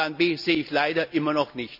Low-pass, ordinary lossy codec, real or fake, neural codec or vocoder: 5.4 kHz; none; real; none